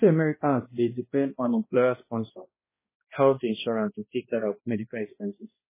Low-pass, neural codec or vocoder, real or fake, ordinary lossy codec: 3.6 kHz; codec, 16 kHz, 1 kbps, X-Codec, HuBERT features, trained on balanced general audio; fake; MP3, 16 kbps